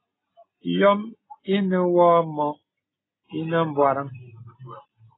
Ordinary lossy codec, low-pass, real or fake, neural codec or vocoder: AAC, 16 kbps; 7.2 kHz; real; none